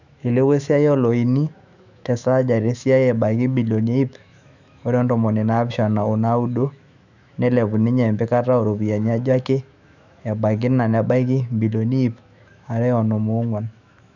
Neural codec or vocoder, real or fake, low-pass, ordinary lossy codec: autoencoder, 48 kHz, 128 numbers a frame, DAC-VAE, trained on Japanese speech; fake; 7.2 kHz; none